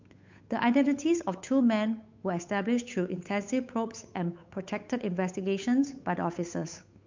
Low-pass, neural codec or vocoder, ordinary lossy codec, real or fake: 7.2 kHz; codec, 16 kHz, 8 kbps, FunCodec, trained on Chinese and English, 25 frames a second; MP3, 64 kbps; fake